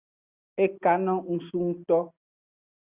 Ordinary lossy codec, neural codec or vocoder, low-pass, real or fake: Opus, 64 kbps; none; 3.6 kHz; real